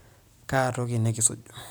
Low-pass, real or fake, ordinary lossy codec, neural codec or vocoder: none; real; none; none